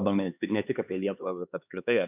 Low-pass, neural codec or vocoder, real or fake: 3.6 kHz; codec, 16 kHz, 4 kbps, X-Codec, HuBERT features, trained on LibriSpeech; fake